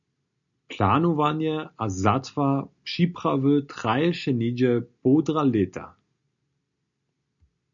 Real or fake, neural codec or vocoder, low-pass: real; none; 7.2 kHz